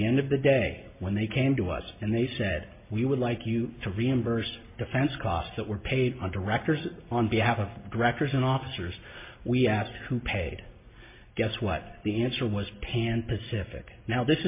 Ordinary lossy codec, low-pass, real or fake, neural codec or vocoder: MP3, 24 kbps; 3.6 kHz; real; none